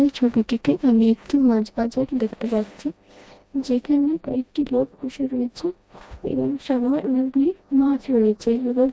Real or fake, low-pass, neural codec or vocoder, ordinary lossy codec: fake; none; codec, 16 kHz, 1 kbps, FreqCodec, smaller model; none